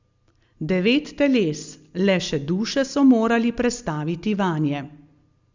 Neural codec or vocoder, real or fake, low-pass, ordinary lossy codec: none; real; 7.2 kHz; Opus, 64 kbps